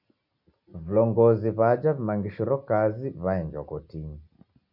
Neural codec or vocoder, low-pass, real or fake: none; 5.4 kHz; real